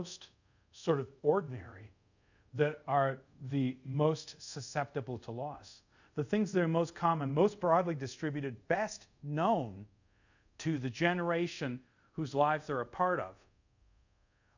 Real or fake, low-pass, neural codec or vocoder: fake; 7.2 kHz; codec, 24 kHz, 0.5 kbps, DualCodec